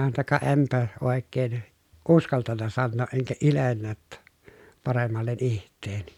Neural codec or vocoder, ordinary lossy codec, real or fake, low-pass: none; none; real; 19.8 kHz